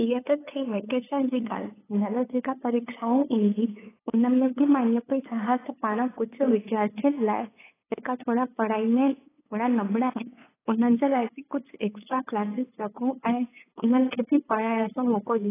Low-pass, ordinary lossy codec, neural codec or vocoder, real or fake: 3.6 kHz; AAC, 16 kbps; codec, 16 kHz, 8 kbps, FunCodec, trained on LibriTTS, 25 frames a second; fake